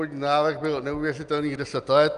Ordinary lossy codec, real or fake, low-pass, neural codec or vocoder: Opus, 32 kbps; real; 10.8 kHz; none